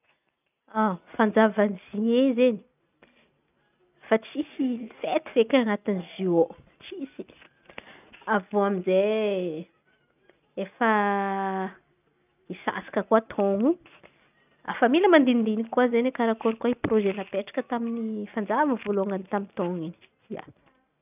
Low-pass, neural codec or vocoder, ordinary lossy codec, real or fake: 3.6 kHz; none; none; real